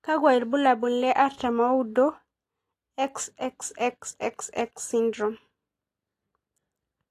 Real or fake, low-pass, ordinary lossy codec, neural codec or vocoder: real; 14.4 kHz; AAC, 48 kbps; none